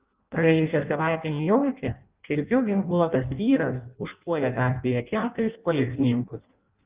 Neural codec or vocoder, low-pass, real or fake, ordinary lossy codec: codec, 16 kHz in and 24 kHz out, 0.6 kbps, FireRedTTS-2 codec; 3.6 kHz; fake; Opus, 24 kbps